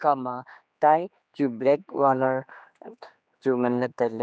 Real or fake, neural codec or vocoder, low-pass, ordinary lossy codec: fake; codec, 16 kHz, 2 kbps, X-Codec, HuBERT features, trained on general audio; none; none